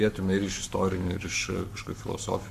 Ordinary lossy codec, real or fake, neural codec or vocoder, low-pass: AAC, 96 kbps; fake; codec, 44.1 kHz, 7.8 kbps, Pupu-Codec; 14.4 kHz